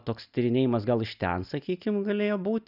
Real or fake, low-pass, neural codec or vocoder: real; 5.4 kHz; none